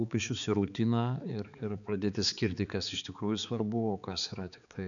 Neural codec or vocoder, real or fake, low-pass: codec, 16 kHz, 4 kbps, X-Codec, HuBERT features, trained on balanced general audio; fake; 7.2 kHz